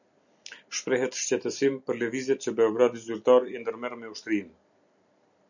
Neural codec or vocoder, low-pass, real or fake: none; 7.2 kHz; real